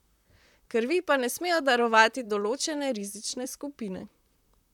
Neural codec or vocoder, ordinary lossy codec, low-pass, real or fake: vocoder, 44.1 kHz, 128 mel bands, Pupu-Vocoder; none; 19.8 kHz; fake